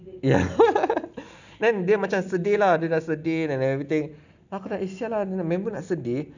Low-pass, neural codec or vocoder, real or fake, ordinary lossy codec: 7.2 kHz; none; real; none